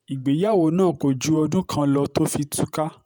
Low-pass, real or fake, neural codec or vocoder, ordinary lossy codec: none; fake; vocoder, 48 kHz, 128 mel bands, Vocos; none